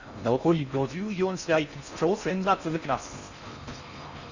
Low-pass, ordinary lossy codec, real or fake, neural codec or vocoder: 7.2 kHz; Opus, 64 kbps; fake; codec, 16 kHz in and 24 kHz out, 0.6 kbps, FocalCodec, streaming, 4096 codes